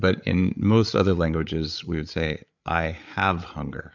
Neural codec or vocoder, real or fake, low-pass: codec, 16 kHz, 8 kbps, FreqCodec, larger model; fake; 7.2 kHz